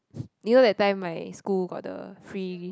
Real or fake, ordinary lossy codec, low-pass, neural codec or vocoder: real; none; none; none